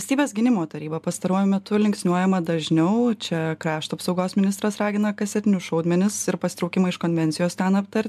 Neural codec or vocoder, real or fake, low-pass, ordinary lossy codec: none; real; 14.4 kHz; MP3, 96 kbps